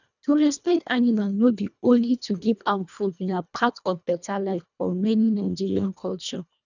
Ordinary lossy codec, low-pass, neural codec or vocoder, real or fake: none; 7.2 kHz; codec, 24 kHz, 1.5 kbps, HILCodec; fake